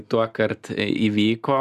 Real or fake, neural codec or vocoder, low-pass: real; none; 14.4 kHz